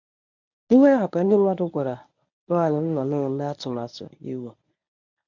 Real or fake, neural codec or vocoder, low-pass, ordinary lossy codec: fake; codec, 24 kHz, 0.9 kbps, WavTokenizer, medium speech release version 1; 7.2 kHz; none